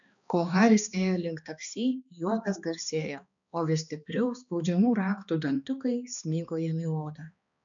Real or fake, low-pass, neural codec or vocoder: fake; 7.2 kHz; codec, 16 kHz, 2 kbps, X-Codec, HuBERT features, trained on balanced general audio